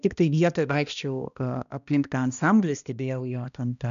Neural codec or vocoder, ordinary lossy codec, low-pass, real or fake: codec, 16 kHz, 1 kbps, X-Codec, HuBERT features, trained on balanced general audio; MP3, 96 kbps; 7.2 kHz; fake